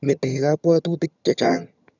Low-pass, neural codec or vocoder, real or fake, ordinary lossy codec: 7.2 kHz; vocoder, 22.05 kHz, 80 mel bands, HiFi-GAN; fake; none